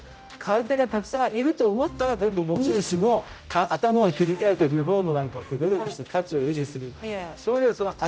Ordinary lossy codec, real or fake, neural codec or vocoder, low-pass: none; fake; codec, 16 kHz, 0.5 kbps, X-Codec, HuBERT features, trained on general audio; none